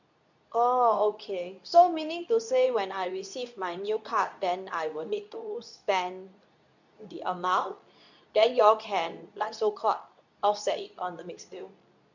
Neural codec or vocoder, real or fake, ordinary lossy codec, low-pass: codec, 24 kHz, 0.9 kbps, WavTokenizer, medium speech release version 2; fake; none; 7.2 kHz